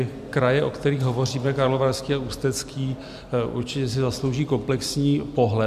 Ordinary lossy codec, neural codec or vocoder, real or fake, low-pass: MP3, 96 kbps; none; real; 14.4 kHz